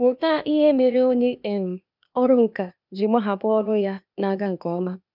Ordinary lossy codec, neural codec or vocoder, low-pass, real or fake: none; codec, 16 kHz, 0.8 kbps, ZipCodec; 5.4 kHz; fake